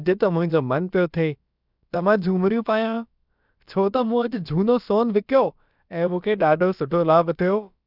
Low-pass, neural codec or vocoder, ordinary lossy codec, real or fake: 5.4 kHz; codec, 16 kHz, about 1 kbps, DyCAST, with the encoder's durations; none; fake